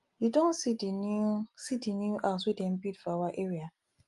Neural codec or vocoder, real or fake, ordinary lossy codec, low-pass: none; real; Opus, 32 kbps; 10.8 kHz